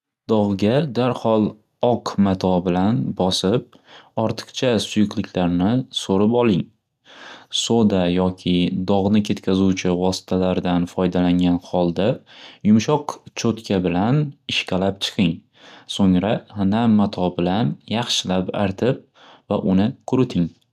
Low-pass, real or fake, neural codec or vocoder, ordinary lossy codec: 14.4 kHz; real; none; none